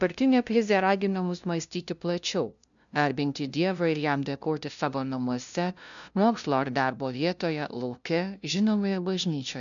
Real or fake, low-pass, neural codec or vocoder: fake; 7.2 kHz; codec, 16 kHz, 0.5 kbps, FunCodec, trained on LibriTTS, 25 frames a second